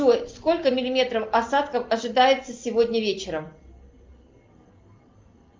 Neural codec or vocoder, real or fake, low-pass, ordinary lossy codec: none; real; 7.2 kHz; Opus, 24 kbps